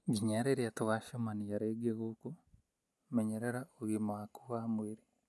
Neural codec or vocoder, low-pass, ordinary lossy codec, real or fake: none; none; none; real